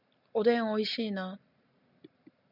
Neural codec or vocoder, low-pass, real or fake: none; 5.4 kHz; real